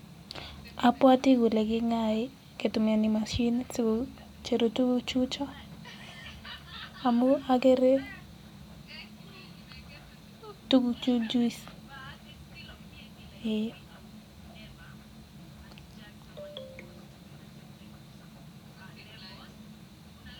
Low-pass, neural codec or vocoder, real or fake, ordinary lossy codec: 19.8 kHz; none; real; none